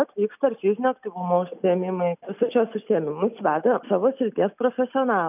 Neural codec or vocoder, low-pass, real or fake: none; 3.6 kHz; real